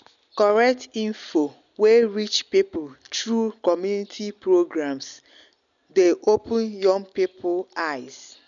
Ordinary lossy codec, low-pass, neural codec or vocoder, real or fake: none; 7.2 kHz; none; real